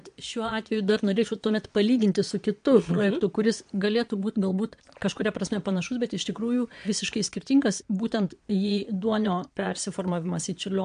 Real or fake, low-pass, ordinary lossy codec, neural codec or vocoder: fake; 9.9 kHz; MP3, 64 kbps; vocoder, 22.05 kHz, 80 mel bands, Vocos